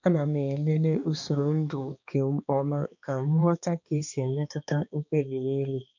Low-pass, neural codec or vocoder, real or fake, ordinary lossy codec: 7.2 kHz; codec, 16 kHz, 2 kbps, X-Codec, HuBERT features, trained on balanced general audio; fake; none